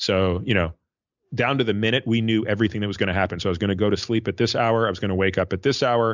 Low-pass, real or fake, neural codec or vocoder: 7.2 kHz; real; none